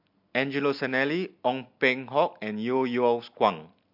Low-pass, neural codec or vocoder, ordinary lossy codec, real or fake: 5.4 kHz; none; MP3, 48 kbps; real